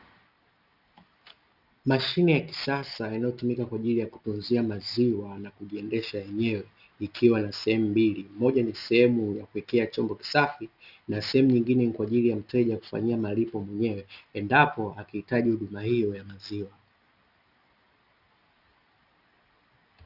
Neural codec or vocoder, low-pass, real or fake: none; 5.4 kHz; real